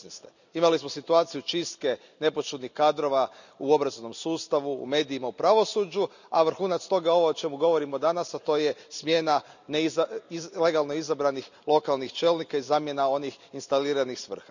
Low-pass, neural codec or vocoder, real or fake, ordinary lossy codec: 7.2 kHz; none; real; none